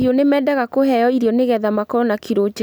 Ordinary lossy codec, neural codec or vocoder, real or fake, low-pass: none; none; real; none